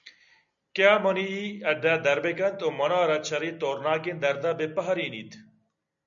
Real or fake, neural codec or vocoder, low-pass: real; none; 7.2 kHz